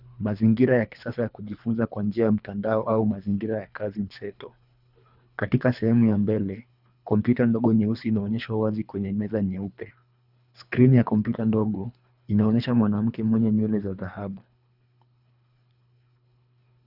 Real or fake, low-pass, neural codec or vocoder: fake; 5.4 kHz; codec, 24 kHz, 3 kbps, HILCodec